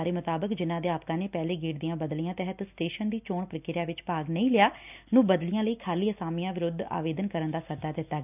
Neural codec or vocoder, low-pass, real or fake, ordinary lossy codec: none; 3.6 kHz; real; none